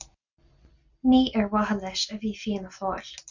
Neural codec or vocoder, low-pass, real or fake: none; 7.2 kHz; real